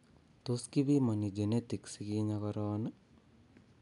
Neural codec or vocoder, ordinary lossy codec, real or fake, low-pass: none; none; real; 10.8 kHz